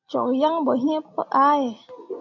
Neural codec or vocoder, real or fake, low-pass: none; real; 7.2 kHz